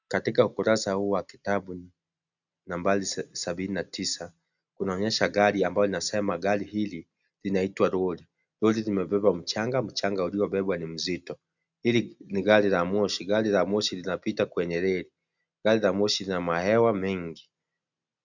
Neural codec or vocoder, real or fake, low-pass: none; real; 7.2 kHz